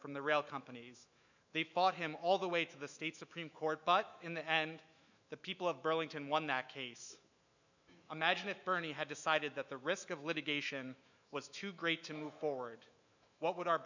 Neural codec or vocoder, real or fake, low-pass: autoencoder, 48 kHz, 128 numbers a frame, DAC-VAE, trained on Japanese speech; fake; 7.2 kHz